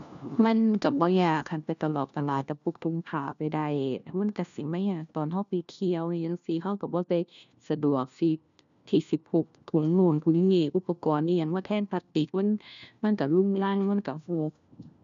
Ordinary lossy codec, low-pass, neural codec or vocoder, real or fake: none; 7.2 kHz; codec, 16 kHz, 1 kbps, FunCodec, trained on LibriTTS, 50 frames a second; fake